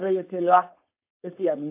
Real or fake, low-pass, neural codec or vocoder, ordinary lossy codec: fake; 3.6 kHz; codec, 16 kHz, 4.8 kbps, FACodec; AAC, 24 kbps